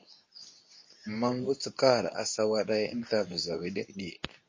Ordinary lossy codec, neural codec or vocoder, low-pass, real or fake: MP3, 32 kbps; codec, 24 kHz, 0.9 kbps, WavTokenizer, medium speech release version 2; 7.2 kHz; fake